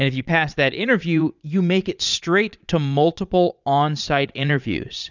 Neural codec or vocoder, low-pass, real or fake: vocoder, 22.05 kHz, 80 mel bands, Vocos; 7.2 kHz; fake